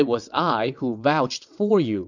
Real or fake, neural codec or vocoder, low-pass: fake; vocoder, 22.05 kHz, 80 mel bands, WaveNeXt; 7.2 kHz